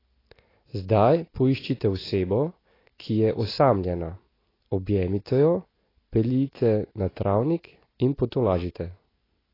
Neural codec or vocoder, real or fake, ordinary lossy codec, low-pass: none; real; AAC, 24 kbps; 5.4 kHz